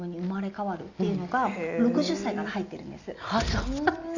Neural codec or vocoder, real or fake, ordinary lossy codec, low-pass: none; real; none; 7.2 kHz